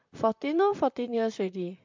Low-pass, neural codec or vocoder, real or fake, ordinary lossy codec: 7.2 kHz; vocoder, 44.1 kHz, 128 mel bands, Pupu-Vocoder; fake; MP3, 64 kbps